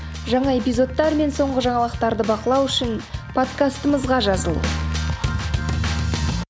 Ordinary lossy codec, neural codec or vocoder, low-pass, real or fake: none; none; none; real